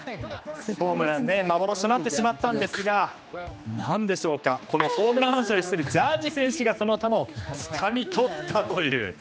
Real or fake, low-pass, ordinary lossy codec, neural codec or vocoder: fake; none; none; codec, 16 kHz, 2 kbps, X-Codec, HuBERT features, trained on general audio